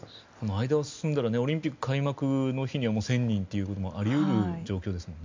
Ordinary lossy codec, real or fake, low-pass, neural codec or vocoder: MP3, 64 kbps; real; 7.2 kHz; none